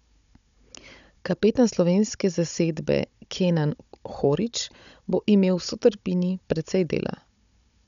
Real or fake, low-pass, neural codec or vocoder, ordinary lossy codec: fake; 7.2 kHz; codec, 16 kHz, 16 kbps, FunCodec, trained on Chinese and English, 50 frames a second; none